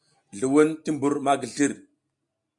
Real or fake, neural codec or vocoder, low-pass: real; none; 10.8 kHz